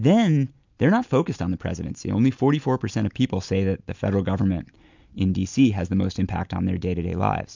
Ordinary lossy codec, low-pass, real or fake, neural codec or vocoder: MP3, 64 kbps; 7.2 kHz; real; none